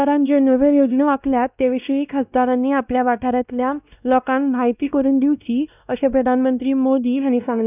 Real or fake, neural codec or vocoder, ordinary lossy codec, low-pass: fake; codec, 16 kHz, 1 kbps, X-Codec, WavLM features, trained on Multilingual LibriSpeech; none; 3.6 kHz